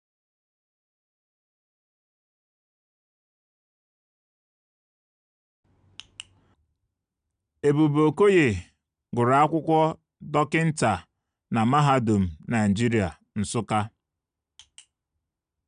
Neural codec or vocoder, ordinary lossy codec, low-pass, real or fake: none; none; 9.9 kHz; real